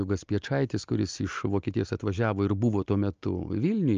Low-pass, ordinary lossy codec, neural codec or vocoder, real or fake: 7.2 kHz; Opus, 24 kbps; none; real